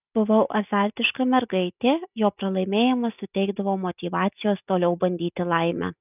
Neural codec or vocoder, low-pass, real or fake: none; 3.6 kHz; real